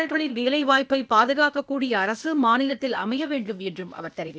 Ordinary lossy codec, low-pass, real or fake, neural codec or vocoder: none; none; fake; codec, 16 kHz, 0.8 kbps, ZipCodec